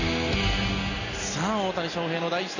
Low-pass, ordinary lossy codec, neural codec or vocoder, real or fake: 7.2 kHz; none; none; real